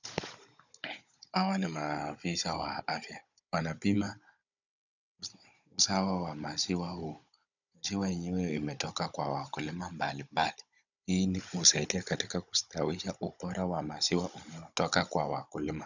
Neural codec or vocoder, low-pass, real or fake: codec, 16 kHz, 16 kbps, FunCodec, trained on Chinese and English, 50 frames a second; 7.2 kHz; fake